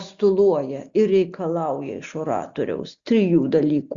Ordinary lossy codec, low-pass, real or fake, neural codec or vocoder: Opus, 64 kbps; 7.2 kHz; real; none